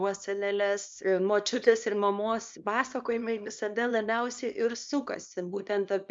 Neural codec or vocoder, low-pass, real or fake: codec, 24 kHz, 0.9 kbps, WavTokenizer, small release; 9.9 kHz; fake